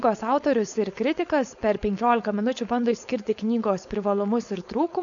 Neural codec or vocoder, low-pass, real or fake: codec, 16 kHz, 4.8 kbps, FACodec; 7.2 kHz; fake